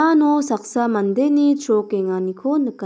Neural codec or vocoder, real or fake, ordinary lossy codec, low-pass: none; real; none; none